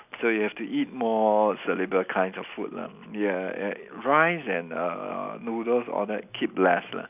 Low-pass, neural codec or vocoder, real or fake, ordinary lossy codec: 3.6 kHz; none; real; none